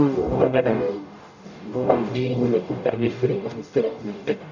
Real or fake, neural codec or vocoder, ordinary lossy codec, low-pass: fake; codec, 44.1 kHz, 0.9 kbps, DAC; none; 7.2 kHz